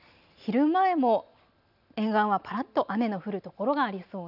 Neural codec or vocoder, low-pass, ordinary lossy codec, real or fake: none; 5.4 kHz; none; real